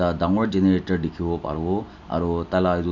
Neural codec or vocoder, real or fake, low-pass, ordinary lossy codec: none; real; 7.2 kHz; none